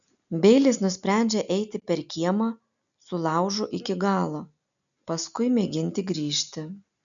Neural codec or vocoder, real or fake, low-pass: none; real; 7.2 kHz